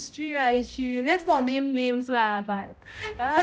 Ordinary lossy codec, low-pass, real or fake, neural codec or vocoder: none; none; fake; codec, 16 kHz, 0.5 kbps, X-Codec, HuBERT features, trained on balanced general audio